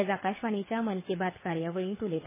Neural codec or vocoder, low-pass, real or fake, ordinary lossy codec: codec, 24 kHz, 6 kbps, HILCodec; 3.6 kHz; fake; MP3, 16 kbps